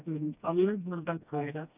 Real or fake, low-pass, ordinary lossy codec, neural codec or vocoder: fake; 3.6 kHz; none; codec, 16 kHz, 1 kbps, FreqCodec, smaller model